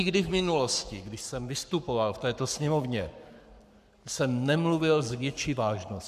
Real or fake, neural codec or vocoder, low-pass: fake; codec, 44.1 kHz, 7.8 kbps, Pupu-Codec; 14.4 kHz